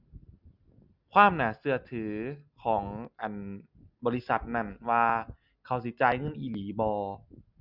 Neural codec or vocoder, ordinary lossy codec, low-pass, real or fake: none; Opus, 64 kbps; 5.4 kHz; real